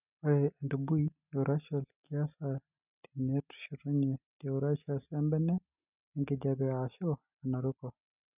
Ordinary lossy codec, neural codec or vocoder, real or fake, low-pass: none; none; real; 3.6 kHz